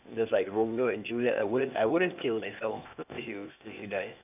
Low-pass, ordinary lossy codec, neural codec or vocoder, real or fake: 3.6 kHz; none; codec, 16 kHz, 0.8 kbps, ZipCodec; fake